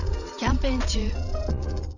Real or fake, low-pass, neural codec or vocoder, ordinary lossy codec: fake; 7.2 kHz; vocoder, 22.05 kHz, 80 mel bands, Vocos; none